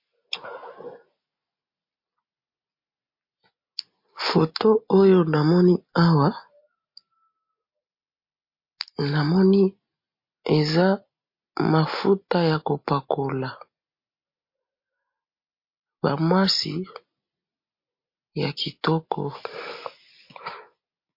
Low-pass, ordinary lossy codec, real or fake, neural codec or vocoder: 5.4 kHz; MP3, 32 kbps; real; none